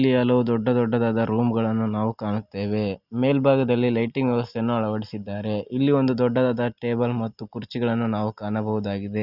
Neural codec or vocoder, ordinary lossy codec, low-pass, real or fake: none; Opus, 64 kbps; 5.4 kHz; real